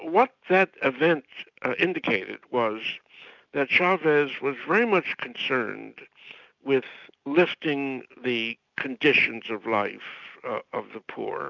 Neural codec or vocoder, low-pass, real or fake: none; 7.2 kHz; real